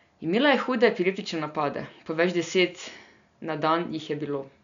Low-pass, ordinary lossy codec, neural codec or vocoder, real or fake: 7.2 kHz; none; none; real